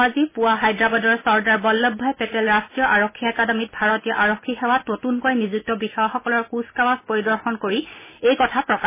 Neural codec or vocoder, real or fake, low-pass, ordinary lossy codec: none; real; 3.6 kHz; MP3, 16 kbps